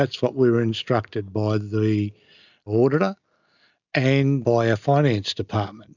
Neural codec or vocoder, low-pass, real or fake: none; 7.2 kHz; real